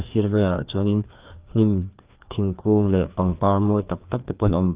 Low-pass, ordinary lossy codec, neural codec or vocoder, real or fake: 3.6 kHz; Opus, 32 kbps; codec, 16 kHz, 2 kbps, FreqCodec, larger model; fake